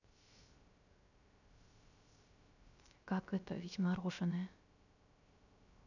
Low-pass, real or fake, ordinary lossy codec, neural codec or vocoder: 7.2 kHz; fake; none; codec, 16 kHz, 0.3 kbps, FocalCodec